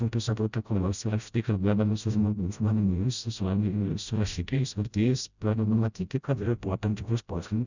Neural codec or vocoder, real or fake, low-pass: codec, 16 kHz, 0.5 kbps, FreqCodec, smaller model; fake; 7.2 kHz